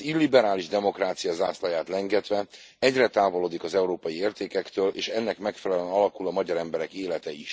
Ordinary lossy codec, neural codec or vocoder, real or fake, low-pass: none; none; real; none